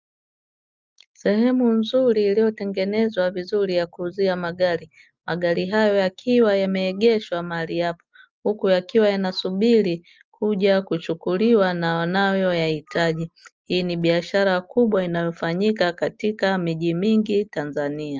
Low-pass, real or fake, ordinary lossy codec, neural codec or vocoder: 7.2 kHz; real; Opus, 24 kbps; none